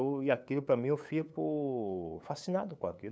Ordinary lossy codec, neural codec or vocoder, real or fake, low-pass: none; codec, 16 kHz, 8 kbps, FunCodec, trained on LibriTTS, 25 frames a second; fake; none